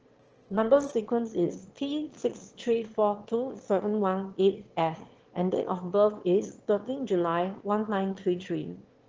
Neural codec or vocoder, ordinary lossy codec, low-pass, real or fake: autoencoder, 22.05 kHz, a latent of 192 numbers a frame, VITS, trained on one speaker; Opus, 16 kbps; 7.2 kHz; fake